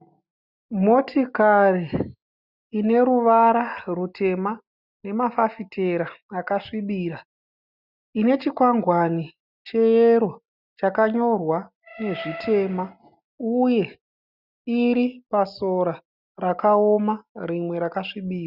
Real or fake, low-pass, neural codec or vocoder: real; 5.4 kHz; none